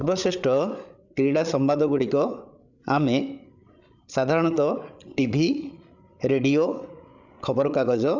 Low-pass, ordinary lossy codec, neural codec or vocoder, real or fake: 7.2 kHz; none; codec, 16 kHz, 8 kbps, FreqCodec, larger model; fake